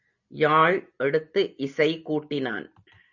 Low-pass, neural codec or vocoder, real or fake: 7.2 kHz; none; real